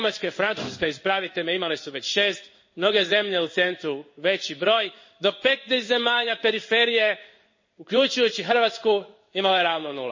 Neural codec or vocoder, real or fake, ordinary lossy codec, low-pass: codec, 16 kHz in and 24 kHz out, 1 kbps, XY-Tokenizer; fake; MP3, 32 kbps; 7.2 kHz